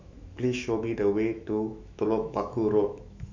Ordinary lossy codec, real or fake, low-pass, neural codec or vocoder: MP3, 64 kbps; fake; 7.2 kHz; autoencoder, 48 kHz, 128 numbers a frame, DAC-VAE, trained on Japanese speech